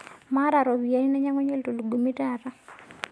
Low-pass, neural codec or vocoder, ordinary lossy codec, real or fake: none; none; none; real